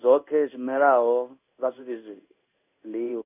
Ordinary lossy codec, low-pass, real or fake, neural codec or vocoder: none; 3.6 kHz; fake; codec, 16 kHz in and 24 kHz out, 1 kbps, XY-Tokenizer